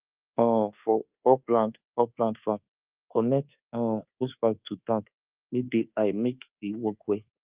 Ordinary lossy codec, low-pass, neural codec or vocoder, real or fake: Opus, 32 kbps; 3.6 kHz; codec, 24 kHz, 1.2 kbps, DualCodec; fake